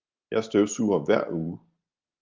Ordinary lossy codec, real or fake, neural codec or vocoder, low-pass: Opus, 32 kbps; real; none; 7.2 kHz